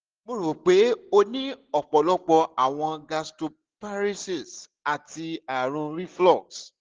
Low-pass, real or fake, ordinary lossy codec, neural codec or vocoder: 7.2 kHz; real; Opus, 16 kbps; none